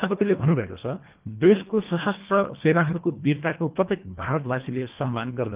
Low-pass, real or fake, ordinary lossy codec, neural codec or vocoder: 3.6 kHz; fake; Opus, 24 kbps; codec, 24 kHz, 1.5 kbps, HILCodec